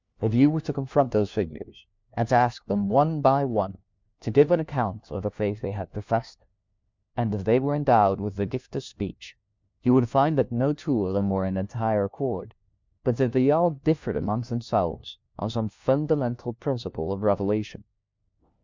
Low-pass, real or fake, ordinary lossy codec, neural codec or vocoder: 7.2 kHz; fake; MP3, 64 kbps; codec, 16 kHz, 1 kbps, FunCodec, trained on LibriTTS, 50 frames a second